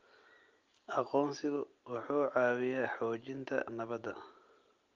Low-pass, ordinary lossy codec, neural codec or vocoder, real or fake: 7.2 kHz; Opus, 32 kbps; none; real